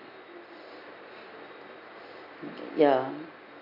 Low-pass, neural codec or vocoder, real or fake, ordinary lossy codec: 5.4 kHz; none; real; none